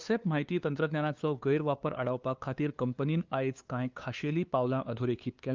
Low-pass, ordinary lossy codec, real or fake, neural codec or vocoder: 7.2 kHz; Opus, 24 kbps; fake; codec, 16 kHz, 2 kbps, FunCodec, trained on LibriTTS, 25 frames a second